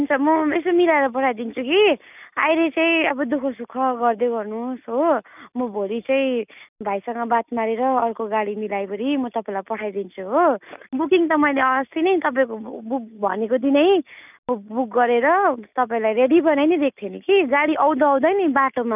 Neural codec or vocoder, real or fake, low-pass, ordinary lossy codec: none; real; 3.6 kHz; none